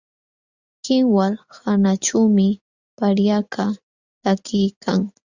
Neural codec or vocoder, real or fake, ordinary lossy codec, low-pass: none; real; Opus, 64 kbps; 7.2 kHz